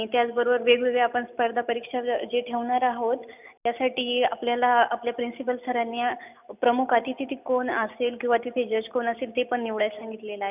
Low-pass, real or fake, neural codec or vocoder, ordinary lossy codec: 3.6 kHz; real; none; none